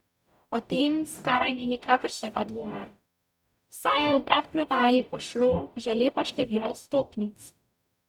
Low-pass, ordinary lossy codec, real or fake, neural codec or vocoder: 19.8 kHz; none; fake; codec, 44.1 kHz, 0.9 kbps, DAC